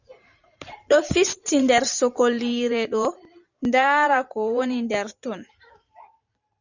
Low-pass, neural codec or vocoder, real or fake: 7.2 kHz; vocoder, 44.1 kHz, 128 mel bands every 512 samples, BigVGAN v2; fake